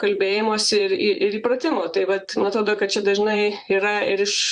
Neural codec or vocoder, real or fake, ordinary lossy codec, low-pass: vocoder, 44.1 kHz, 128 mel bands, Pupu-Vocoder; fake; Opus, 64 kbps; 10.8 kHz